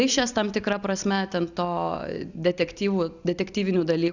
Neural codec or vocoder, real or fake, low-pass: none; real; 7.2 kHz